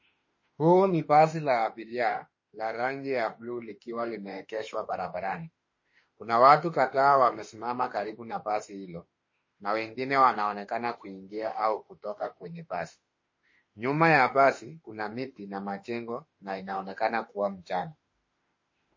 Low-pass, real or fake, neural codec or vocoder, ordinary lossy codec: 7.2 kHz; fake; autoencoder, 48 kHz, 32 numbers a frame, DAC-VAE, trained on Japanese speech; MP3, 32 kbps